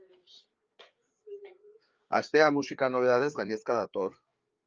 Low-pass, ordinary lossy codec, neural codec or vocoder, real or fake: 7.2 kHz; Opus, 24 kbps; codec, 16 kHz, 4 kbps, FreqCodec, larger model; fake